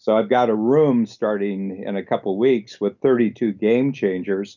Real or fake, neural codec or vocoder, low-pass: real; none; 7.2 kHz